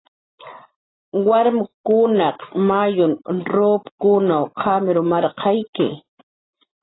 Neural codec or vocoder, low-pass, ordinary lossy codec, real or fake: none; 7.2 kHz; AAC, 16 kbps; real